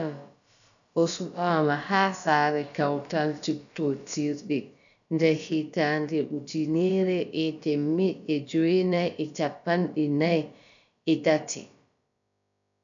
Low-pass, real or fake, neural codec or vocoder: 7.2 kHz; fake; codec, 16 kHz, about 1 kbps, DyCAST, with the encoder's durations